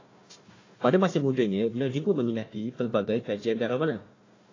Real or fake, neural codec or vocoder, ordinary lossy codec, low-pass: fake; codec, 16 kHz, 1 kbps, FunCodec, trained on Chinese and English, 50 frames a second; AAC, 32 kbps; 7.2 kHz